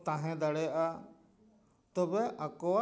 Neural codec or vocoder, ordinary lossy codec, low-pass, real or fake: none; none; none; real